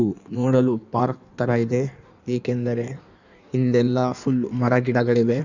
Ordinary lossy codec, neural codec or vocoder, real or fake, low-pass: none; codec, 16 kHz in and 24 kHz out, 1.1 kbps, FireRedTTS-2 codec; fake; 7.2 kHz